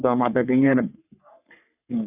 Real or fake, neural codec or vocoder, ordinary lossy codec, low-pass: fake; codec, 44.1 kHz, 3.4 kbps, Pupu-Codec; none; 3.6 kHz